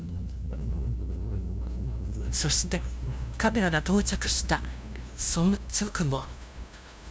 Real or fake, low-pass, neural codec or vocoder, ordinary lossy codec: fake; none; codec, 16 kHz, 0.5 kbps, FunCodec, trained on LibriTTS, 25 frames a second; none